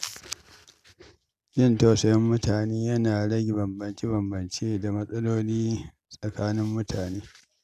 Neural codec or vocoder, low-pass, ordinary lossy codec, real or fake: none; 14.4 kHz; none; real